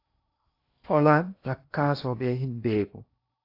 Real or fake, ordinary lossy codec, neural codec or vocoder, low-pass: fake; AAC, 32 kbps; codec, 16 kHz in and 24 kHz out, 0.8 kbps, FocalCodec, streaming, 65536 codes; 5.4 kHz